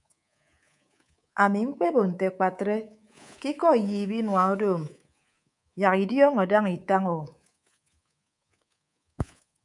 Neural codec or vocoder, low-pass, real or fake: codec, 24 kHz, 3.1 kbps, DualCodec; 10.8 kHz; fake